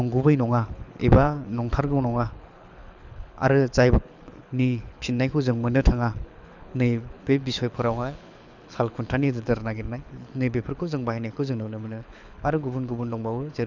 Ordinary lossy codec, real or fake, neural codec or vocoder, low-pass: none; fake; codec, 24 kHz, 6 kbps, HILCodec; 7.2 kHz